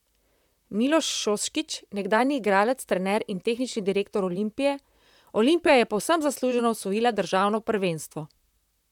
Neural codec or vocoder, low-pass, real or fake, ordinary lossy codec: vocoder, 44.1 kHz, 128 mel bands, Pupu-Vocoder; 19.8 kHz; fake; none